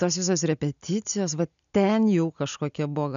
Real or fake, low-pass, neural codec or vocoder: real; 7.2 kHz; none